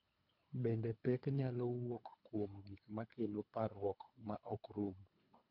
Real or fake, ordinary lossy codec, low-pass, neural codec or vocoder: fake; none; 5.4 kHz; codec, 24 kHz, 3 kbps, HILCodec